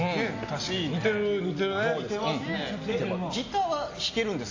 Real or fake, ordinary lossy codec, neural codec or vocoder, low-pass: real; AAC, 32 kbps; none; 7.2 kHz